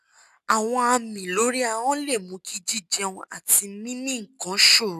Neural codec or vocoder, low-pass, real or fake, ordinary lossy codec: none; 14.4 kHz; real; none